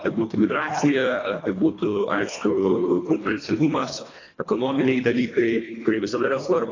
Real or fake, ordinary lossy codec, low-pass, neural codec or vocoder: fake; AAC, 32 kbps; 7.2 kHz; codec, 24 kHz, 1.5 kbps, HILCodec